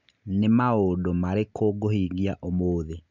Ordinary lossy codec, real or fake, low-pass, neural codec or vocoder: none; real; 7.2 kHz; none